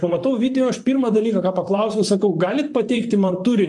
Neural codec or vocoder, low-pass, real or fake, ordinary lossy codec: vocoder, 44.1 kHz, 128 mel bands, Pupu-Vocoder; 10.8 kHz; fake; AAC, 64 kbps